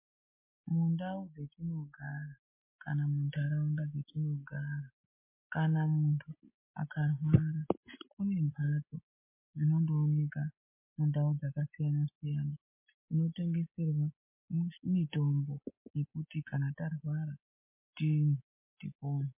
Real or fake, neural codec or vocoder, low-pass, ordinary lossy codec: real; none; 3.6 kHz; MP3, 24 kbps